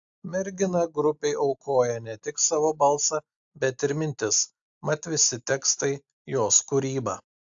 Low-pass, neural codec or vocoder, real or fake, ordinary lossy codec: 7.2 kHz; none; real; AAC, 64 kbps